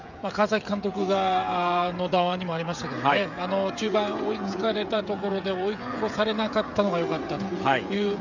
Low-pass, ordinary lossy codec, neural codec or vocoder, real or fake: 7.2 kHz; none; codec, 16 kHz, 16 kbps, FreqCodec, smaller model; fake